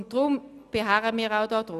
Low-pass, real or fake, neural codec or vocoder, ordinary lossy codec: 14.4 kHz; real; none; none